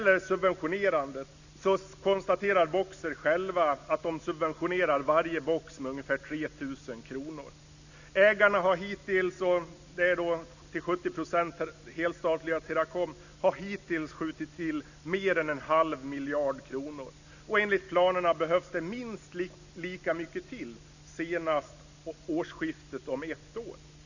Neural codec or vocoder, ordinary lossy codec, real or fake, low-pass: none; none; real; 7.2 kHz